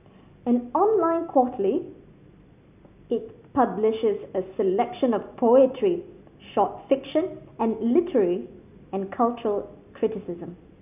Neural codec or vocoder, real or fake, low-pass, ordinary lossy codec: none; real; 3.6 kHz; none